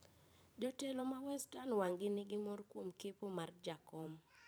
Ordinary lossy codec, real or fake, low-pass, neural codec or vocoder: none; fake; none; vocoder, 44.1 kHz, 128 mel bands every 512 samples, BigVGAN v2